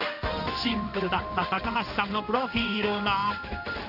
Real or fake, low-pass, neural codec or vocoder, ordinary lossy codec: fake; 5.4 kHz; codec, 16 kHz in and 24 kHz out, 1 kbps, XY-Tokenizer; none